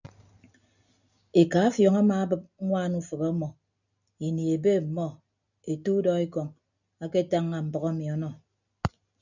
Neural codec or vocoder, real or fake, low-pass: none; real; 7.2 kHz